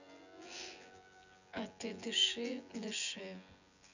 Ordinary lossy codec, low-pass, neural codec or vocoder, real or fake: none; 7.2 kHz; vocoder, 24 kHz, 100 mel bands, Vocos; fake